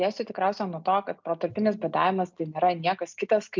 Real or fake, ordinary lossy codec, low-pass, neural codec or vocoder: real; MP3, 64 kbps; 7.2 kHz; none